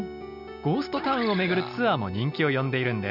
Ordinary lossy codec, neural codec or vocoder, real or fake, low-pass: none; none; real; 5.4 kHz